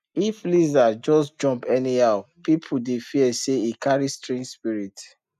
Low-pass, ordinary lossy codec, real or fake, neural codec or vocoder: 14.4 kHz; none; real; none